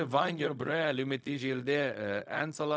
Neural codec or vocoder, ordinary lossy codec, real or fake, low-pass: codec, 16 kHz, 0.4 kbps, LongCat-Audio-Codec; none; fake; none